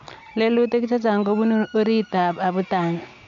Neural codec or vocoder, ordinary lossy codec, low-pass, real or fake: none; MP3, 64 kbps; 7.2 kHz; real